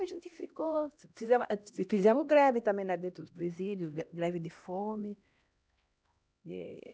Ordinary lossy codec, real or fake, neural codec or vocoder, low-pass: none; fake; codec, 16 kHz, 1 kbps, X-Codec, HuBERT features, trained on LibriSpeech; none